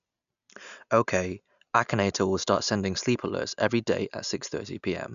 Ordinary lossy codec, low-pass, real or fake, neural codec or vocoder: none; 7.2 kHz; real; none